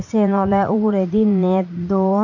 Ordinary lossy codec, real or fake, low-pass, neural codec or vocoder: none; fake; 7.2 kHz; vocoder, 44.1 kHz, 80 mel bands, Vocos